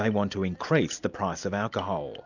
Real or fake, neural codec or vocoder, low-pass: real; none; 7.2 kHz